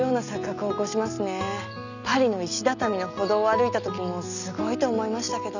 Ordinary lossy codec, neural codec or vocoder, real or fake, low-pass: none; none; real; 7.2 kHz